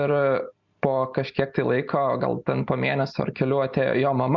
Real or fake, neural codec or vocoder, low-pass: real; none; 7.2 kHz